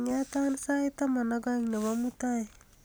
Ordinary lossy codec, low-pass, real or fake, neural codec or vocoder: none; none; real; none